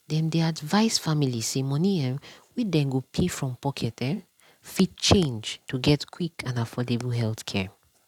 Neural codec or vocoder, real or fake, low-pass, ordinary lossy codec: none; real; 19.8 kHz; none